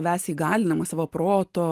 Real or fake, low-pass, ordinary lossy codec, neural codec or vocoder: real; 14.4 kHz; Opus, 24 kbps; none